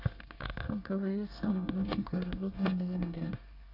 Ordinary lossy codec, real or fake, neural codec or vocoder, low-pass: none; fake; codec, 24 kHz, 1 kbps, SNAC; 5.4 kHz